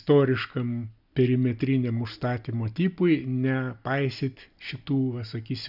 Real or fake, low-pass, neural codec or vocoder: real; 5.4 kHz; none